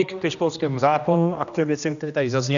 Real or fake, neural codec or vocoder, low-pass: fake; codec, 16 kHz, 1 kbps, X-Codec, HuBERT features, trained on general audio; 7.2 kHz